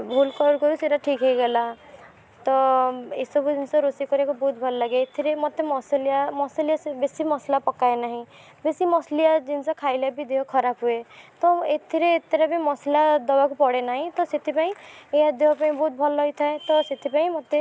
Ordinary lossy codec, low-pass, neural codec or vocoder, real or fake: none; none; none; real